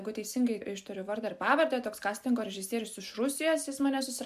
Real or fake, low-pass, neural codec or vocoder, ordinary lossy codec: real; 14.4 kHz; none; MP3, 96 kbps